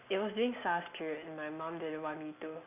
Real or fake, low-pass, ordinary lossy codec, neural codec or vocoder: real; 3.6 kHz; none; none